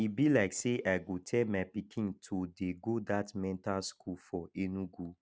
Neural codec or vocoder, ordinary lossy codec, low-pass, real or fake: none; none; none; real